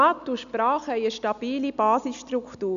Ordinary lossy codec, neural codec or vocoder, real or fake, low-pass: none; none; real; 7.2 kHz